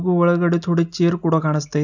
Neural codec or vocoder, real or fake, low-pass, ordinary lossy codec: none; real; 7.2 kHz; none